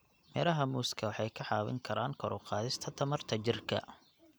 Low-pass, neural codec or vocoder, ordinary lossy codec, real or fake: none; none; none; real